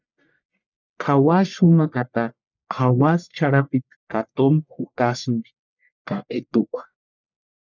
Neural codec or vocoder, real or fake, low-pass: codec, 44.1 kHz, 1.7 kbps, Pupu-Codec; fake; 7.2 kHz